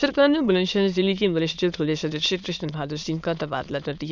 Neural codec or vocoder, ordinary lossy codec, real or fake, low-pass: autoencoder, 22.05 kHz, a latent of 192 numbers a frame, VITS, trained on many speakers; none; fake; 7.2 kHz